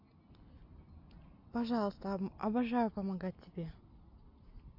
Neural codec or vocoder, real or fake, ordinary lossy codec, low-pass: none; real; AAC, 48 kbps; 5.4 kHz